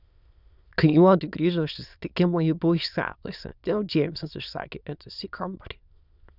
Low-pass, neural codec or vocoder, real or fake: 5.4 kHz; autoencoder, 22.05 kHz, a latent of 192 numbers a frame, VITS, trained on many speakers; fake